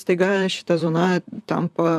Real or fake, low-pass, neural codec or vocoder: fake; 14.4 kHz; vocoder, 44.1 kHz, 128 mel bands, Pupu-Vocoder